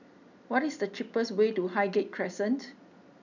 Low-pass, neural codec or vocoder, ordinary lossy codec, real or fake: 7.2 kHz; none; none; real